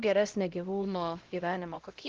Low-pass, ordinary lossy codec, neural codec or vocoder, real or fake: 7.2 kHz; Opus, 16 kbps; codec, 16 kHz, 0.5 kbps, X-Codec, HuBERT features, trained on LibriSpeech; fake